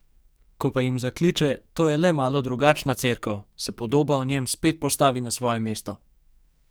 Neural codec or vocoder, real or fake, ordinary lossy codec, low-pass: codec, 44.1 kHz, 2.6 kbps, SNAC; fake; none; none